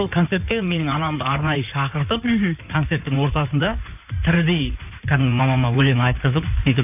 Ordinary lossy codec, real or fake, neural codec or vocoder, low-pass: none; fake; codec, 16 kHz in and 24 kHz out, 2.2 kbps, FireRedTTS-2 codec; 3.6 kHz